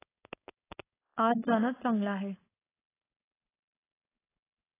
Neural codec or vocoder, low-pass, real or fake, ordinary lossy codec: codec, 16 kHz, 4.8 kbps, FACodec; 3.6 kHz; fake; AAC, 16 kbps